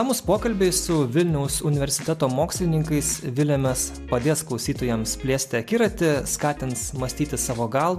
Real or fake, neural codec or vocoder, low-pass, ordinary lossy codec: real; none; 14.4 kHz; AAC, 96 kbps